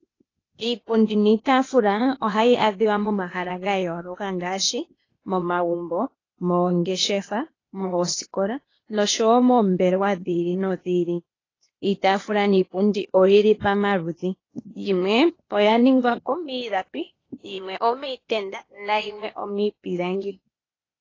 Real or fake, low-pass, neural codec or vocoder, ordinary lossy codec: fake; 7.2 kHz; codec, 16 kHz, 0.8 kbps, ZipCodec; AAC, 32 kbps